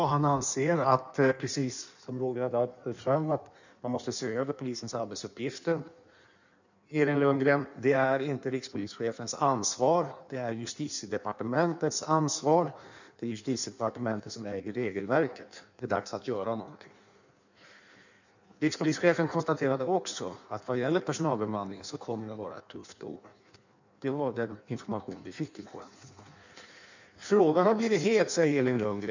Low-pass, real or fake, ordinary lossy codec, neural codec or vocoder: 7.2 kHz; fake; none; codec, 16 kHz in and 24 kHz out, 1.1 kbps, FireRedTTS-2 codec